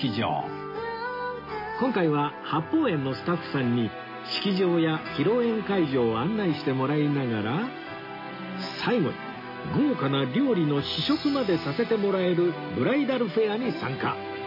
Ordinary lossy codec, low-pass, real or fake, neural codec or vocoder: MP3, 24 kbps; 5.4 kHz; real; none